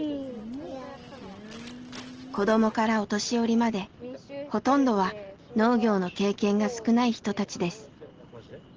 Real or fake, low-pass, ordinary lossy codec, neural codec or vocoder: real; 7.2 kHz; Opus, 16 kbps; none